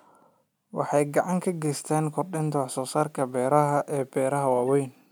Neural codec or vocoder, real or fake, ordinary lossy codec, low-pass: none; real; none; none